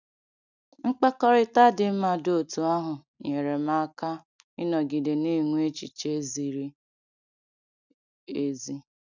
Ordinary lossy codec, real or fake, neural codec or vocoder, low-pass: none; real; none; 7.2 kHz